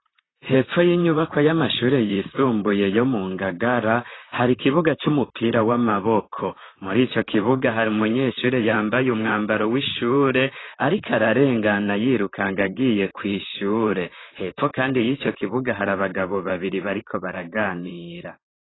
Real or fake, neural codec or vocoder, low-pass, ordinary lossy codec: fake; vocoder, 44.1 kHz, 128 mel bands, Pupu-Vocoder; 7.2 kHz; AAC, 16 kbps